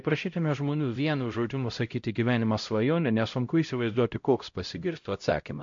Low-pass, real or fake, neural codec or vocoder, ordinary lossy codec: 7.2 kHz; fake; codec, 16 kHz, 0.5 kbps, X-Codec, HuBERT features, trained on LibriSpeech; MP3, 48 kbps